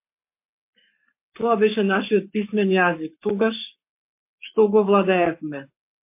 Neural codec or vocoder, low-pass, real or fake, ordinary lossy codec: codec, 44.1 kHz, 7.8 kbps, Pupu-Codec; 3.6 kHz; fake; MP3, 24 kbps